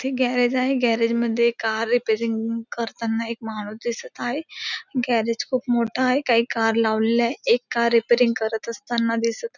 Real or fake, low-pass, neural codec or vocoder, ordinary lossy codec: real; 7.2 kHz; none; none